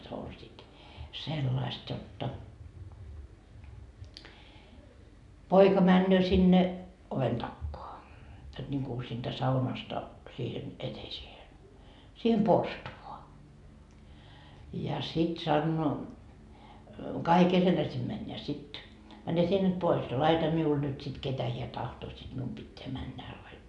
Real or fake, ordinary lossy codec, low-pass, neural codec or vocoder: real; none; 10.8 kHz; none